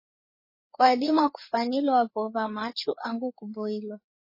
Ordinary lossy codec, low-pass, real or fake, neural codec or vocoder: MP3, 24 kbps; 5.4 kHz; fake; codec, 16 kHz, 4 kbps, FreqCodec, larger model